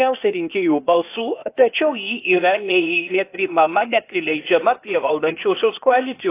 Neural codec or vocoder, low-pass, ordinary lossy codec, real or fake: codec, 16 kHz, 0.8 kbps, ZipCodec; 3.6 kHz; AAC, 24 kbps; fake